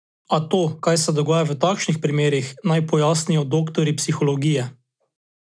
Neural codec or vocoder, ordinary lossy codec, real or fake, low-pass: none; none; real; 9.9 kHz